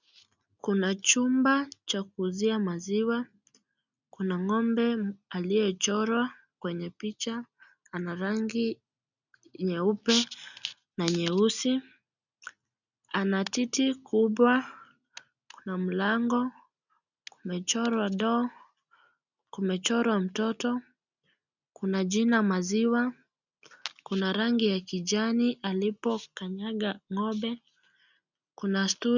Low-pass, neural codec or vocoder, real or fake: 7.2 kHz; none; real